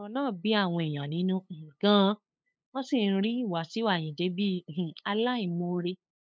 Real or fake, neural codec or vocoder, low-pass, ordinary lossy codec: fake; codec, 16 kHz, 8 kbps, FunCodec, trained on LibriTTS, 25 frames a second; none; none